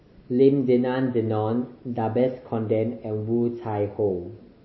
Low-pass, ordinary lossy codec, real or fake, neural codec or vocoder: 7.2 kHz; MP3, 24 kbps; real; none